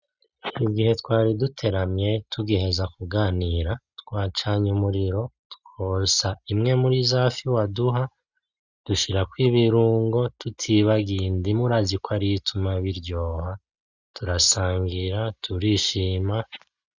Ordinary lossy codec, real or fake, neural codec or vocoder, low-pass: Opus, 64 kbps; real; none; 7.2 kHz